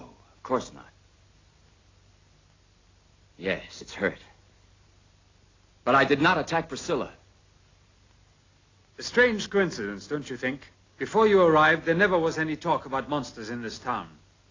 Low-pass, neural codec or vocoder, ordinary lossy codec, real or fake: 7.2 kHz; none; AAC, 32 kbps; real